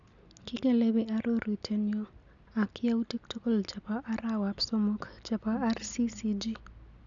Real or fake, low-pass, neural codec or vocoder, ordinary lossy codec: real; 7.2 kHz; none; none